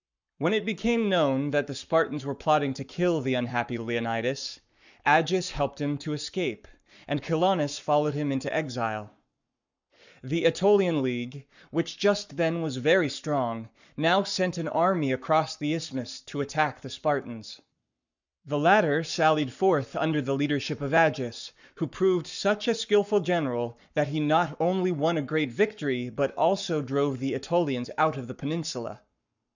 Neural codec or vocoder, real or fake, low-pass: codec, 44.1 kHz, 7.8 kbps, Pupu-Codec; fake; 7.2 kHz